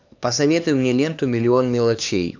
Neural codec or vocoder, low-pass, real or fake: codec, 16 kHz, 2 kbps, X-Codec, HuBERT features, trained on LibriSpeech; 7.2 kHz; fake